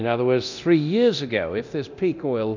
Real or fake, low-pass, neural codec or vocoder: fake; 7.2 kHz; codec, 24 kHz, 0.9 kbps, DualCodec